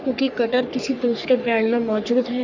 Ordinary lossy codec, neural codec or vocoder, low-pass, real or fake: none; codec, 44.1 kHz, 3.4 kbps, Pupu-Codec; 7.2 kHz; fake